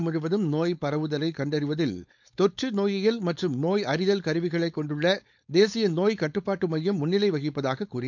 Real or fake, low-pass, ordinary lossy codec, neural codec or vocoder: fake; 7.2 kHz; none; codec, 16 kHz, 4.8 kbps, FACodec